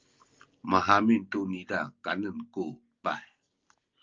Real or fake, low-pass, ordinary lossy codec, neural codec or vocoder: real; 7.2 kHz; Opus, 24 kbps; none